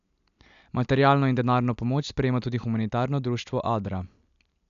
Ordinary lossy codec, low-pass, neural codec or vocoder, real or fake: none; 7.2 kHz; none; real